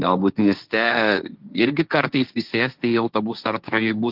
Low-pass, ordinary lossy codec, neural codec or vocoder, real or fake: 5.4 kHz; Opus, 24 kbps; codec, 16 kHz, 1.1 kbps, Voila-Tokenizer; fake